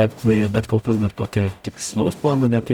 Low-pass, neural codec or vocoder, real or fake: 19.8 kHz; codec, 44.1 kHz, 0.9 kbps, DAC; fake